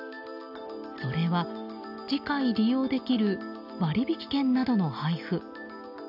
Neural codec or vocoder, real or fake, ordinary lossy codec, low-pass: none; real; none; 5.4 kHz